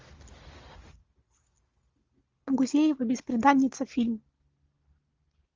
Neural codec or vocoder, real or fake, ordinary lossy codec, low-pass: none; real; Opus, 32 kbps; 7.2 kHz